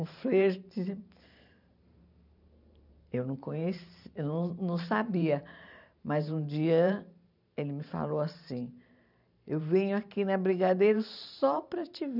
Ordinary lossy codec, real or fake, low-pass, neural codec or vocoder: none; fake; 5.4 kHz; vocoder, 44.1 kHz, 128 mel bands every 512 samples, BigVGAN v2